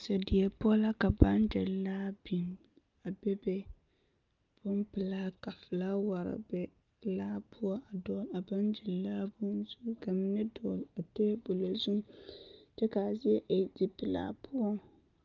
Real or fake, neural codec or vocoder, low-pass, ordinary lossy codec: real; none; 7.2 kHz; Opus, 32 kbps